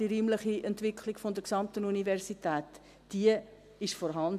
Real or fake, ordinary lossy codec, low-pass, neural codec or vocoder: real; AAC, 96 kbps; 14.4 kHz; none